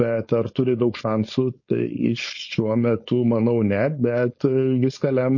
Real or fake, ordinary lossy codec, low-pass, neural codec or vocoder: fake; MP3, 32 kbps; 7.2 kHz; codec, 16 kHz, 4.8 kbps, FACodec